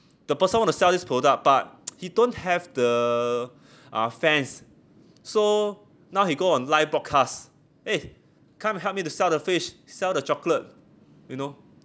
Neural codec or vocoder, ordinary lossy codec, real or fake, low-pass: none; none; real; none